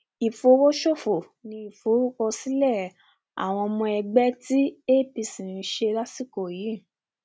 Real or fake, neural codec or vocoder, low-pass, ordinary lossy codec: real; none; none; none